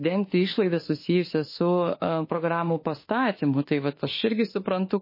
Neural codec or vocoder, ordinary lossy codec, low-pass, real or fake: autoencoder, 48 kHz, 32 numbers a frame, DAC-VAE, trained on Japanese speech; MP3, 24 kbps; 5.4 kHz; fake